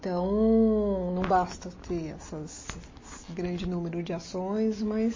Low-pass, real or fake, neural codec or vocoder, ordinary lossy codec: 7.2 kHz; real; none; MP3, 32 kbps